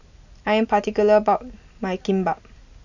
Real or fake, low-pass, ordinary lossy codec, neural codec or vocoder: real; 7.2 kHz; none; none